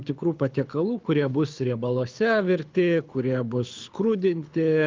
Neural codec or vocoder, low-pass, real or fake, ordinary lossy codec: codec, 24 kHz, 6 kbps, HILCodec; 7.2 kHz; fake; Opus, 32 kbps